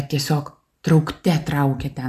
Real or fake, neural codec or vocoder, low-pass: real; none; 14.4 kHz